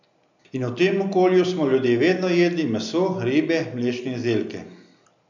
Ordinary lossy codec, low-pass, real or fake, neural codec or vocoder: none; 7.2 kHz; real; none